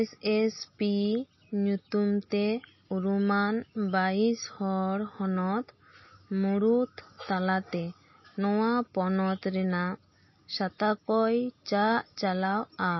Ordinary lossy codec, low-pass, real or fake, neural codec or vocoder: MP3, 24 kbps; 7.2 kHz; real; none